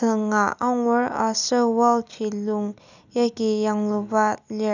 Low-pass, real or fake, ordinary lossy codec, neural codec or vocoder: 7.2 kHz; real; none; none